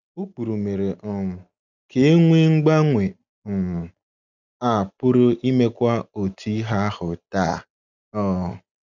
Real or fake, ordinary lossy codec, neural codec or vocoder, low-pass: real; none; none; 7.2 kHz